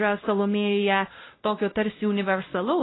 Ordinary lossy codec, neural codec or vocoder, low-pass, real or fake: AAC, 16 kbps; codec, 16 kHz, 0.5 kbps, X-Codec, WavLM features, trained on Multilingual LibriSpeech; 7.2 kHz; fake